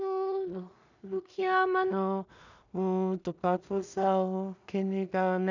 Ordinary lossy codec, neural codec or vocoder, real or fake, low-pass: none; codec, 16 kHz in and 24 kHz out, 0.4 kbps, LongCat-Audio-Codec, two codebook decoder; fake; 7.2 kHz